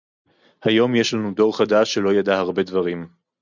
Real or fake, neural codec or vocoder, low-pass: real; none; 7.2 kHz